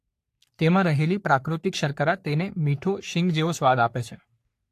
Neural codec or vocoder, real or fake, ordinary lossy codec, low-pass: codec, 44.1 kHz, 3.4 kbps, Pupu-Codec; fake; AAC, 64 kbps; 14.4 kHz